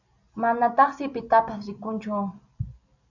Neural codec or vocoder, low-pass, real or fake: none; 7.2 kHz; real